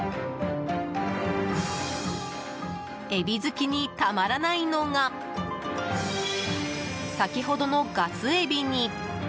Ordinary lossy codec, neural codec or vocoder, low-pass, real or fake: none; none; none; real